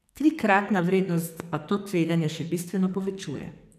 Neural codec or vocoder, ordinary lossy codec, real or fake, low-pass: codec, 44.1 kHz, 2.6 kbps, SNAC; none; fake; 14.4 kHz